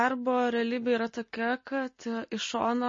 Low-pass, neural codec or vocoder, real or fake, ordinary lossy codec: 7.2 kHz; none; real; MP3, 32 kbps